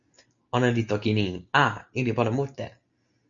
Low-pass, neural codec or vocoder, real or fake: 7.2 kHz; none; real